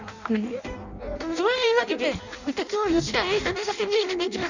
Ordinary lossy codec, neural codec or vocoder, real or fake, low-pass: none; codec, 16 kHz in and 24 kHz out, 0.6 kbps, FireRedTTS-2 codec; fake; 7.2 kHz